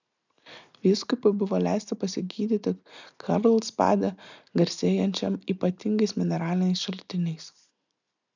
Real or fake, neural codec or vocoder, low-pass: real; none; 7.2 kHz